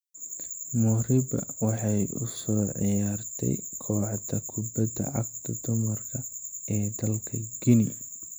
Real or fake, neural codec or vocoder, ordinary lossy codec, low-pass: real; none; none; none